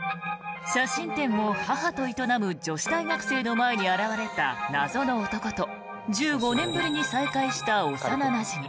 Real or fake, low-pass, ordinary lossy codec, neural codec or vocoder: real; none; none; none